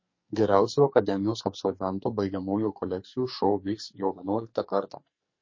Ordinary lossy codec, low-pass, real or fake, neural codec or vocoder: MP3, 32 kbps; 7.2 kHz; fake; codec, 44.1 kHz, 2.6 kbps, SNAC